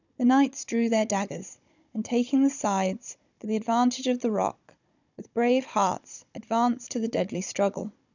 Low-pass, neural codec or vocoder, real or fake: 7.2 kHz; codec, 16 kHz, 16 kbps, FunCodec, trained on Chinese and English, 50 frames a second; fake